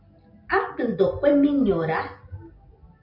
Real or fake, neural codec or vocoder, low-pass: real; none; 5.4 kHz